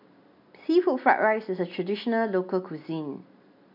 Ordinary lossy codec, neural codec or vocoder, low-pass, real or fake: none; none; 5.4 kHz; real